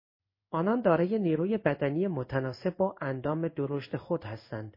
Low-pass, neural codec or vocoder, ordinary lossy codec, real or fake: 5.4 kHz; codec, 16 kHz in and 24 kHz out, 1 kbps, XY-Tokenizer; MP3, 24 kbps; fake